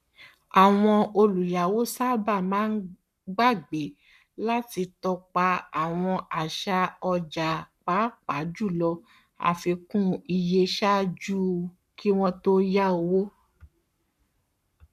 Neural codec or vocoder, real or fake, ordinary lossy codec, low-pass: codec, 44.1 kHz, 7.8 kbps, Pupu-Codec; fake; none; 14.4 kHz